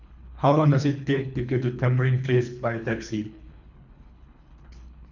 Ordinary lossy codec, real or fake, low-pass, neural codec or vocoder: none; fake; 7.2 kHz; codec, 24 kHz, 3 kbps, HILCodec